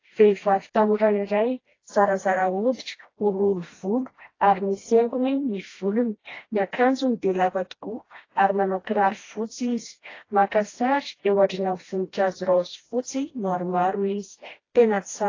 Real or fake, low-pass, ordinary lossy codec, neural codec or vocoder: fake; 7.2 kHz; AAC, 32 kbps; codec, 16 kHz, 1 kbps, FreqCodec, smaller model